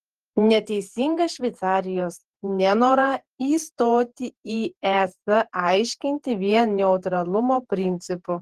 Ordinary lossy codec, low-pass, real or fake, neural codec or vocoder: Opus, 16 kbps; 14.4 kHz; fake; vocoder, 48 kHz, 128 mel bands, Vocos